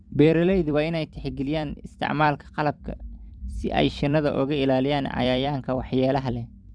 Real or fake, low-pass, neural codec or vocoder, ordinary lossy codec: fake; 9.9 kHz; vocoder, 44.1 kHz, 128 mel bands every 256 samples, BigVGAN v2; Opus, 64 kbps